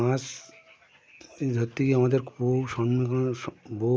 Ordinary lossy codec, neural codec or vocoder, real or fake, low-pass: none; none; real; none